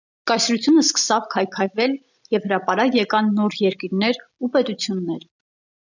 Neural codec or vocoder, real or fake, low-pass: none; real; 7.2 kHz